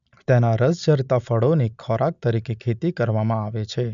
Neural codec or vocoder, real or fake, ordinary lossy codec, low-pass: none; real; none; 7.2 kHz